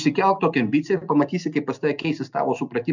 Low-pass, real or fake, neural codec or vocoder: 7.2 kHz; real; none